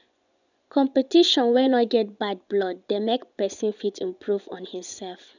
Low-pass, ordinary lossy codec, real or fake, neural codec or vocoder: 7.2 kHz; none; fake; vocoder, 24 kHz, 100 mel bands, Vocos